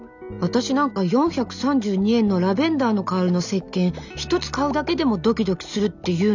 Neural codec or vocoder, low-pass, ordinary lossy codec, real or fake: none; 7.2 kHz; none; real